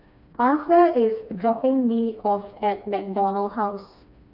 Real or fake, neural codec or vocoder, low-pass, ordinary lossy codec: fake; codec, 16 kHz, 2 kbps, FreqCodec, smaller model; 5.4 kHz; none